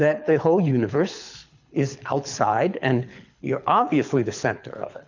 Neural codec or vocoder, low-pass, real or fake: codec, 24 kHz, 6 kbps, HILCodec; 7.2 kHz; fake